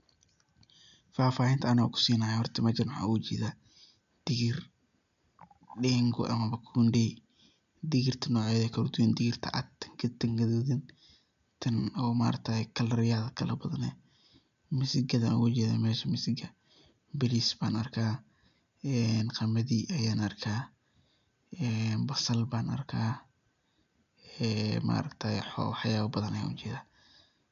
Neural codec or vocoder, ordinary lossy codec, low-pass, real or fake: none; none; 7.2 kHz; real